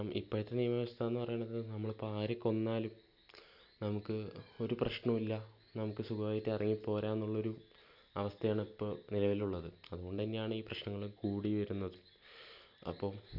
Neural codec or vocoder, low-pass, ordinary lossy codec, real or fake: none; 5.4 kHz; none; real